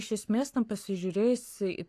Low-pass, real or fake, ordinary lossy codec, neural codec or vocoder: 14.4 kHz; fake; AAC, 64 kbps; codec, 44.1 kHz, 7.8 kbps, Pupu-Codec